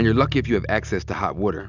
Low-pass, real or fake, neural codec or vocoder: 7.2 kHz; real; none